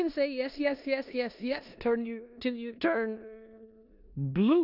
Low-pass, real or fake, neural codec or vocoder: 5.4 kHz; fake; codec, 16 kHz in and 24 kHz out, 0.9 kbps, LongCat-Audio-Codec, four codebook decoder